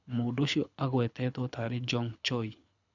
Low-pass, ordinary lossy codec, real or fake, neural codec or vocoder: 7.2 kHz; none; fake; codec, 24 kHz, 6 kbps, HILCodec